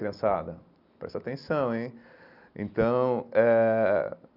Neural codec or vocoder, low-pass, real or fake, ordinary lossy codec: none; 5.4 kHz; real; none